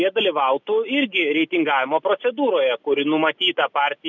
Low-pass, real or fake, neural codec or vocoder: 7.2 kHz; real; none